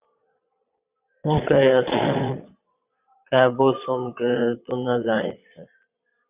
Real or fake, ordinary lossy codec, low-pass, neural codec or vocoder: fake; Opus, 64 kbps; 3.6 kHz; vocoder, 22.05 kHz, 80 mel bands, Vocos